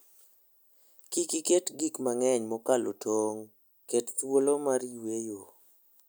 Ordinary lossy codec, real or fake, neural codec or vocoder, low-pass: none; real; none; none